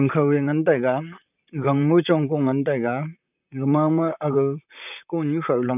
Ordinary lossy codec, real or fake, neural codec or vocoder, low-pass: none; fake; vocoder, 44.1 kHz, 128 mel bands, Pupu-Vocoder; 3.6 kHz